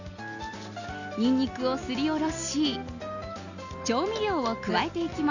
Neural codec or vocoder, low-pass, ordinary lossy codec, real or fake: none; 7.2 kHz; none; real